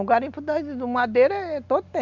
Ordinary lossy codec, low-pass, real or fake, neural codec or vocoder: Opus, 64 kbps; 7.2 kHz; real; none